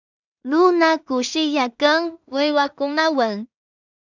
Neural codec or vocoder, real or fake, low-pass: codec, 16 kHz in and 24 kHz out, 0.4 kbps, LongCat-Audio-Codec, two codebook decoder; fake; 7.2 kHz